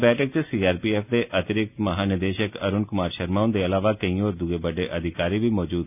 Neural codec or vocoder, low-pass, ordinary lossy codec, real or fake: none; 3.6 kHz; none; real